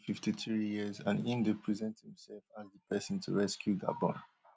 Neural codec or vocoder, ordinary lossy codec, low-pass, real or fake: none; none; none; real